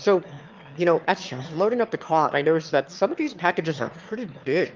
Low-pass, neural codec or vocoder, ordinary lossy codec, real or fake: 7.2 kHz; autoencoder, 22.05 kHz, a latent of 192 numbers a frame, VITS, trained on one speaker; Opus, 24 kbps; fake